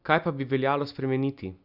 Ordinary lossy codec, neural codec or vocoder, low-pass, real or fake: none; none; 5.4 kHz; real